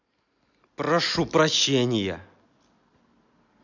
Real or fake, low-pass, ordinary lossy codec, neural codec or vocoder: real; 7.2 kHz; none; none